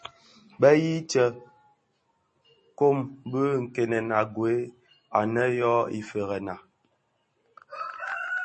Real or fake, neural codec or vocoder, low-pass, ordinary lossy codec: real; none; 10.8 kHz; MP3, 32 kbps